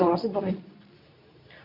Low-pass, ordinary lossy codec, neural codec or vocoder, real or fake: 5.4 kHz; none; codec, 24 kHz, 0.9 kbps, WavTokenizer, medium speech release version 2; fake